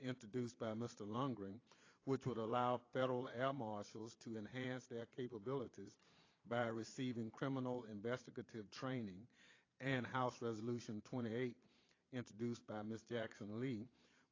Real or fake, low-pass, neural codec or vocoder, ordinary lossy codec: fake; 7.2 kHz; vocoder, 22.05 kHz, 80 mel bands, Vocos; AAC, 32 kbps